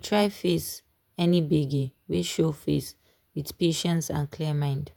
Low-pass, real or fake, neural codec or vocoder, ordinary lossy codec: none; fake; vocoder, 48 kHz, 128 mel bands, Vocos; none